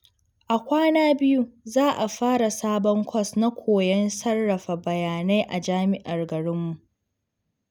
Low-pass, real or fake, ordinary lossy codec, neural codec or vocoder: none; real; none; none